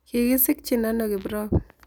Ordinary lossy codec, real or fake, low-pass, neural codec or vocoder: none; real; none; none